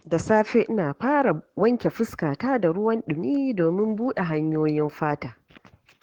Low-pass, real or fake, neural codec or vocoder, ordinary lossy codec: 19.8 kHz; fake; codec, 44.1 kHz, 7.8 kbps, Pupu-Codec; Opus, 16 kbps